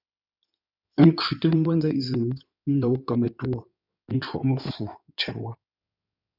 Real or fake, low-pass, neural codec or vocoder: fake; 5.4 kHz; codec, 16 kHz in and 24 kHz out, 2.2 kbps, FireRedTTS-2 codec